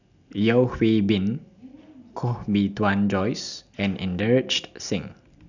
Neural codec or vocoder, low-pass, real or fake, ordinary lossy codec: none; 7.2 kHz; real; none